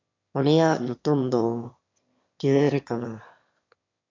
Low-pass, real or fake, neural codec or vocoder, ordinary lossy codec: 7.2 kHz; fake; autoencoder, 22.05 kHz, a latent of 192 numbers a frame, VITS, trained on one speaker; MP3, 48 kbps